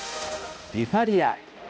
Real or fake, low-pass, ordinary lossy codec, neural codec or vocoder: fake; none; none; codec, 16 kHz, 0.5 kbps, X-Codec, HuBERT features, trained on balanced general audio